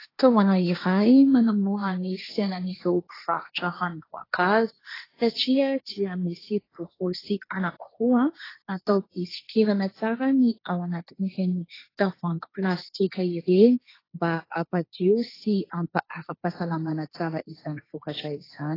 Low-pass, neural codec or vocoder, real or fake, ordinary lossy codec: 5.4 kHz; codec, 16 kHz, 1.1 kbps, Voila-Tokenizer; fake; AAC, 24 kbps